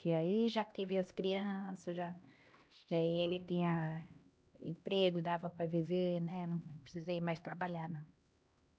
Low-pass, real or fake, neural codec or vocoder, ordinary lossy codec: none; fake; codec, 16 kHz, 1 kbps, X-Codec, HuBERT features, trained on LibriSpeech; none